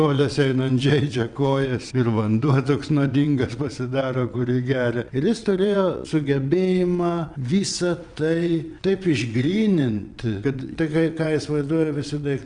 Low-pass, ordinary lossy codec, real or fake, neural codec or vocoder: 9.9 kHz; Opus, 64 kbps; fake; vocoder, 22.05 kHz, 80 mel bands, WaveNeXt